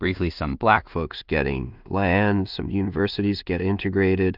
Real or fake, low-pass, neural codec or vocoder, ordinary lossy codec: fake; 5.4 kHz; codec, 16 kHz in and 24 kHz out, 0.4 kbps, LongCat-Audio-Codec, two codebook decoder; Opus, 24 kbps